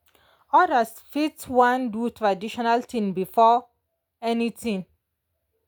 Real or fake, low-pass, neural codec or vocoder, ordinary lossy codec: real; none; none; none